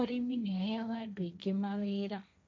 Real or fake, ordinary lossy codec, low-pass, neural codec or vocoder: fake; none; none; codec, 16 kHz, 1.1 kbps, Voila-Tokenizer